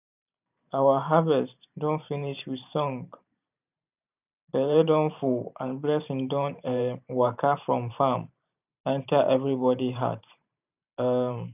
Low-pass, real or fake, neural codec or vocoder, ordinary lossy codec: 3.6 kHz; fake; vocoder, 44.1 kHz, 128 mel bands every 512 samples, BigVGAN v2; none